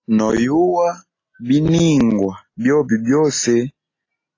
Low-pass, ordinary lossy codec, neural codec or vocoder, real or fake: 7.2 kHz; AAC, 32 kbps; none; real